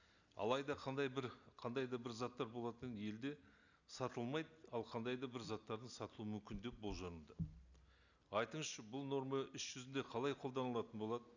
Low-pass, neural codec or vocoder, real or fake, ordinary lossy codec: 7.2 kHz; none; real; none